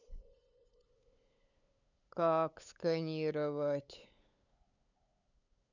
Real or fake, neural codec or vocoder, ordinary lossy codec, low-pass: fake; codec, 16 kHz, 8 kbps, FunCodec, trained on LibriTTS, 25 frames a second; none; 7.2 kHz